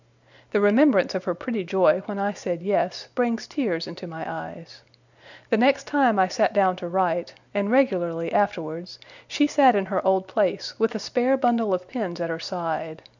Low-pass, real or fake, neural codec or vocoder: 7.2 kHz; real; none